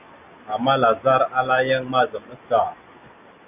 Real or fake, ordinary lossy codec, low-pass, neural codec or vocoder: real; AAC, 32 kbps; 3.6 kHz; none